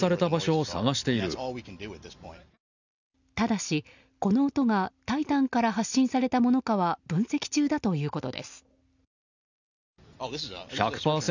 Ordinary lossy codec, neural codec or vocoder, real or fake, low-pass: none; none; real; 7.2 kHz